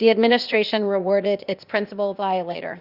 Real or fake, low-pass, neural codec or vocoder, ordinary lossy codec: fake; 5.4 kHz; codec, 16 kHz, 0.8 kbps, ZipCodec; Opus, 64 kbps